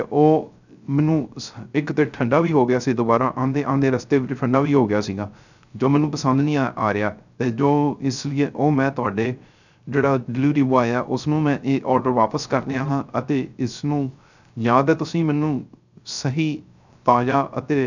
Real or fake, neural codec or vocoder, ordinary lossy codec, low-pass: fake; codec, 16 kHz, 0.3 kbps, FocalCodec; none; 7.2 kHz